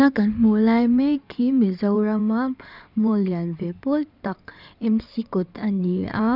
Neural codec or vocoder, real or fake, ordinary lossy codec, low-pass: codec, 16 kHz in and 24 kHz out, 2.2 kbps, FireRedTTS-2 codec; fake; none; 5.4 kHz